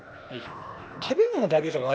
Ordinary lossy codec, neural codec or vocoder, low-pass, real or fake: none; codec, 16 kHz, 0.8 kbps, ZipCodec; none; fake